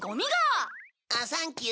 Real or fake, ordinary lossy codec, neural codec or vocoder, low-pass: real; none; none; none